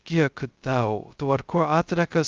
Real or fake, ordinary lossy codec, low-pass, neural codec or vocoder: fake; Opus, 24 kbps; 7.2 kHz; codec, 16 kHz, 0.2 kbps, FocalCodec